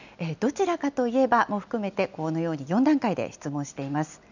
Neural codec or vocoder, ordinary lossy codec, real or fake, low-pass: none; none; real; 7.2 kHz